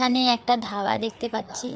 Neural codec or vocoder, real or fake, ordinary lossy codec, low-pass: codec, 16 kHz, 4 kbps, FreqCodec, larger model; fake; none; none